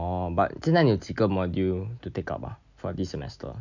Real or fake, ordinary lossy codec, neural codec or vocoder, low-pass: real; none; none; 7.2 kHz